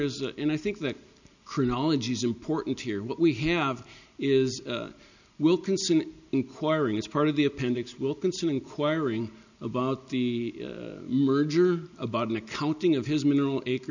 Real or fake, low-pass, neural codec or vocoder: real; 7.2 kHz; none